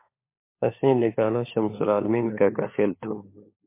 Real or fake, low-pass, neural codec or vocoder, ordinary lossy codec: fake; 3.6 kHz; codec, 16 kHz, 4 kbps, FunCodec, trained on LibriTTS, 50 frames a second; MP3, 24 kbps